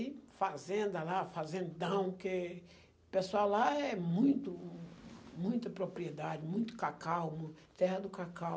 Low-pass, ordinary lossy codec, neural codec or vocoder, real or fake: none; none; none; real